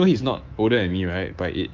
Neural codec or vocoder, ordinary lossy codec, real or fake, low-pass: codec, 24 kHz, 3.1 kbps, DualCodec; Opus, 24 kbps; fake; 7.2 kHz